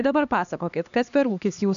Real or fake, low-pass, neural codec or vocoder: fake; 7.2 kHz; codec, 16 kHz, 2 kbps, X-Codec, HuBERT features, trained on LibriSpeech